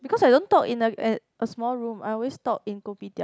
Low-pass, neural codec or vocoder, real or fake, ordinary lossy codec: none; none; real; none